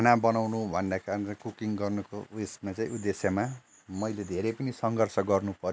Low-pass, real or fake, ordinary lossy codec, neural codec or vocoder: none; real; none; none